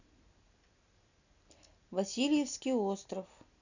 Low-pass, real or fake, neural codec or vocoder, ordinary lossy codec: 7.2 kHz; real; none; none